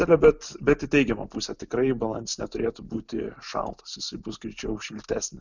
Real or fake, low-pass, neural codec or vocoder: real; 7.2 kHz; none